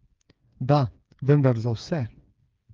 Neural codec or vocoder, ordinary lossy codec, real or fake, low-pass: codec, 16 kHz, 4 kbps, FreqCodec, smaller model; Opus, 32 kbps; fake; 7.2 kHz